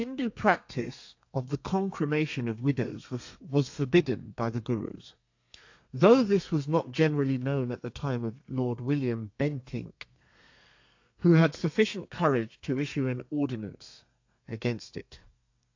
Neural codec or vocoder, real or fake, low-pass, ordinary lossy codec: codec, 32 kHz, 1.9 kbps, SNAC; fake; 7.2 kHz; AAC, 48 kbps